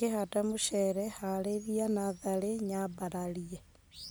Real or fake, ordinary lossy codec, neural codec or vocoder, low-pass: real; none; none; none